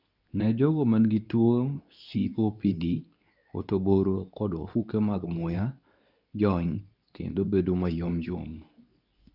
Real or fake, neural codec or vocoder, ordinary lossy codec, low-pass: fake; codec, 24 kHz, 0.9 kbps, WavTokenizer, medium speech release version 2; none; 5.4 kHz